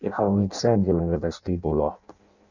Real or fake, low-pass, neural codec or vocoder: fake; 7.2 kHz; codec, 16 kHz in and 24 kHz out, 0.6 kbps, FireRedTTS-2 codec